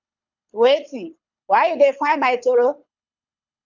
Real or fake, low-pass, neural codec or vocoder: fake; 7.2 kHz; codec, 24 kHz, 6 kbps, HILCodec